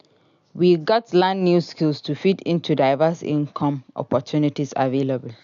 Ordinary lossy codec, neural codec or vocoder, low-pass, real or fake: none; none; 7.2 kHz; real